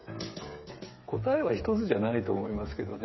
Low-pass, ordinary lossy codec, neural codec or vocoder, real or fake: 7.2 kHz; MP3, 24 kbps; codec, 16 kHz, 8 kbps, FreqCodec, smaller model; fake